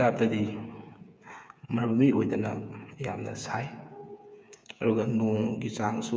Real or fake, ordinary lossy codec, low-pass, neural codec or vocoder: fake; none; none; codec, 16 kHz, 8 kbps, FreqCodec, smaller model